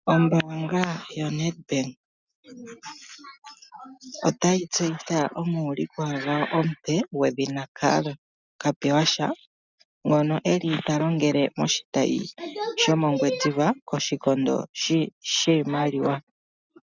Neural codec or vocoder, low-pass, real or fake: none; 7.2 kHz; real